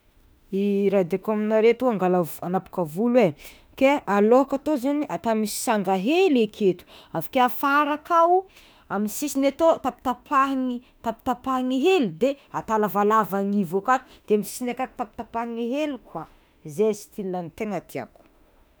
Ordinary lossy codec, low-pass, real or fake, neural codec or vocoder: none; none; fake; autoencoder, 48 kHz, 32 numbers a frame, DAC-VAE, trained on Japanese speech